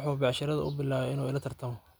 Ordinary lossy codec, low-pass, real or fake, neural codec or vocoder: none; none; real; none